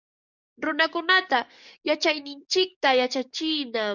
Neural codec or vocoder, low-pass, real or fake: codec, 44.1 kHz, 7.8 kbps, DAC; 7.2 kHz; fake